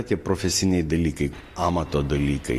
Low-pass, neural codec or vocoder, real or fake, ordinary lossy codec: 14.4 kHz; none; real; AAC, 48 kbps